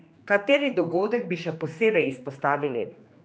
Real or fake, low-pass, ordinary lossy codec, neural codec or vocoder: fake; none; none; codec, 16 kHz, 2 kbps, X-Codec, HuBERT features, trained on general audio